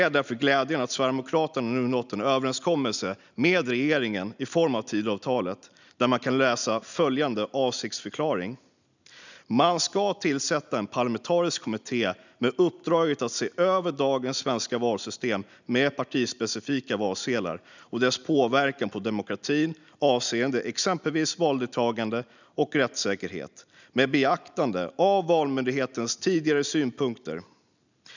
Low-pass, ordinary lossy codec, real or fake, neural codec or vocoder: 7.2 kHz; none; real; none